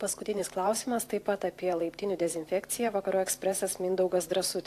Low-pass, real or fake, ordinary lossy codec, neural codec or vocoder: 14.4 kHz; fake; AAC, 64 kbps; vocoder, 44.1 kHz, 128 mel bands every 512 samples, BigVGAN v2